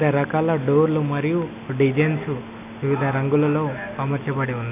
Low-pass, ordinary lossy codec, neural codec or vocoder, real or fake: 3.6 kHz; none; none; real